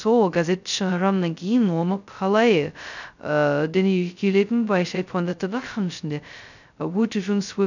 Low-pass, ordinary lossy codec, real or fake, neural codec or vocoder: 7.2 kHz; none; fake; codec, 16 kHz, 0.2 kbps, FocalCodec